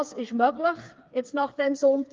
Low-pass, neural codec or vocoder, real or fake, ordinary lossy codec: 7.2 kHz; codec, 16 kHz, 4 kbps, FreqCodec, smaller model; fake; Opus, 32 kbps